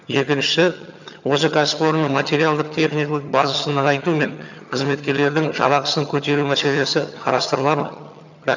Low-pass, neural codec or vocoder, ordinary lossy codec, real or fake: 7.2 kHz; vocoder, 22.05 kHz, 80 mel bands, HiFi-GAN; none; fake